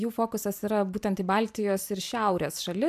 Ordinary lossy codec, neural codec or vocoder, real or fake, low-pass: AAC, 96 kbps; vocoder, 44.1 kHz, 128 mel bands every 512 samples, BigVGAN v2; fake; 14.4 kHz